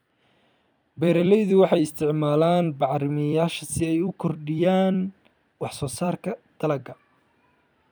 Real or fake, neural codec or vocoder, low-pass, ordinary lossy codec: fake; vocoder, 44.1 kHz, 128 mel bands every 256 samples, BigVGAN v2; none; none